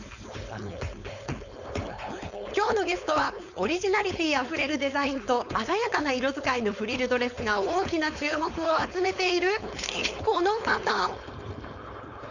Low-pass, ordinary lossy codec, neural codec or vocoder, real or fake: 7.2 kHz; none; codec, 16 kHz, 4.8 kbps, FACodec; fake